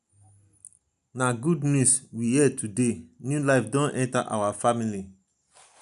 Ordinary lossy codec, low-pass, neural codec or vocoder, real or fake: none; 10.8 kHz; none; real